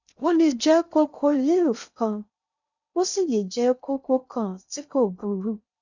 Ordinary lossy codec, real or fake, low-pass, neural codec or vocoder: none; fake; 7.2 kHz; codec, 16 kHz in and 24 kHz out, 0.6 kbps, FocalCodec, streaming, 2048 codes